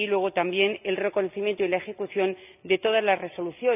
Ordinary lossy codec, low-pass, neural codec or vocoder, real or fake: none; 3.6 kHz; none; real